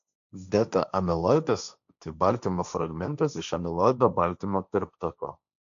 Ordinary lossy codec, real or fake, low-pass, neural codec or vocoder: AAC, 96 kbps; fake; 7.2 kHz; codec, 16 kHz, 1.1 kbps, Voila-Tokenizer